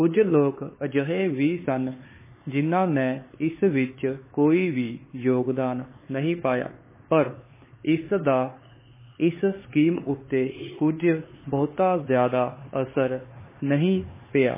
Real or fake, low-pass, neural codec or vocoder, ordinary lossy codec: fake; 3.6 kHz; codec, 16 kHz, 4 kbps, X-Codec, HuBERT features, trained on LibriSpeech; MP3, 16 kbps